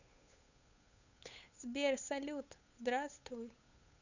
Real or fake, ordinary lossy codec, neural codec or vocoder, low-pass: fake; none; codec, 16 kHz, 8 kbps, FunCodec, trained on Chinese and English, 25 frames a second; 7.2 kHz